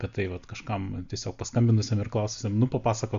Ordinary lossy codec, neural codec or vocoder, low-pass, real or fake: Opus, 64 kbps; none; 7.2 kHz; real